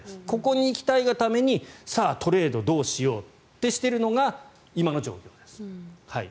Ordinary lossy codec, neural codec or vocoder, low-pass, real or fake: none; none; none; real